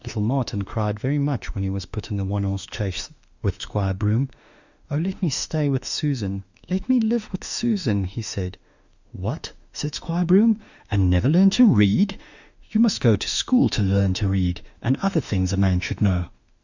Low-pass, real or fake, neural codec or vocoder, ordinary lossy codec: 7.2 kHz; fake; autoencoder, 48 kHz, 32 numbers a frame, DAC-VAE, trained on Japanese speech; Opus, 64 kbps